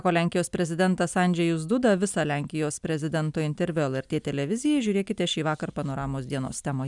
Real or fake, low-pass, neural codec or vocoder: real; 10.8 kHz; none